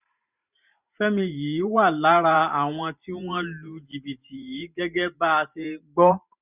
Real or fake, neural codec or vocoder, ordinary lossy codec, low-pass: fake; vocoder, 44.1 kHz, 128 mel bands every 512 samples, BigVGAN v2; none; 3.6 kHz